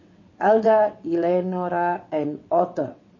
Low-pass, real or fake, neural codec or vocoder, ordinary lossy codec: 7.2 kHz; fake; vocoder, 22.05 kHz, 80 mel bands, WaveNeXt; MP3, 32 kbps